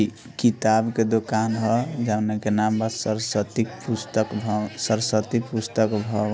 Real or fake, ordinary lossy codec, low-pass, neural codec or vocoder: real; none; none; none